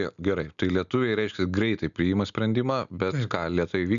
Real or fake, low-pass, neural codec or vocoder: real; 7.2 kHz; none